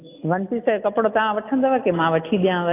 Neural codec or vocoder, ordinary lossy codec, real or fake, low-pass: none; AAC, 24 kbps; real; 3.6 kHz